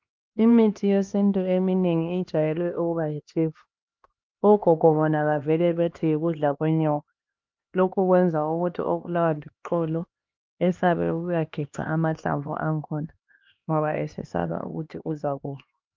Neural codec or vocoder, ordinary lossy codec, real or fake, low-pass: codec, 16 kHz, 2 kbps, X-Codec, HuBERT features, trained on LibriSpeech; Opus, 24 kbps; fake; 7.2 kHz